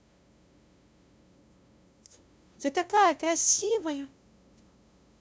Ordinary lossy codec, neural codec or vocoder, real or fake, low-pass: none; codec, 16 kHz, 0.5 kbps, FunCodec, trained on LibriTTS, 25 frames a second; fake; none